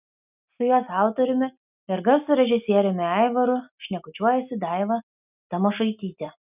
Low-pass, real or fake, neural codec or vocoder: 3.6 kHz; real; none